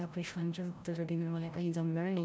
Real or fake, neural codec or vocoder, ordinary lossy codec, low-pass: fake; codec, 16 kHz, 0.5 kbps, FreqCodec, larger model; none; none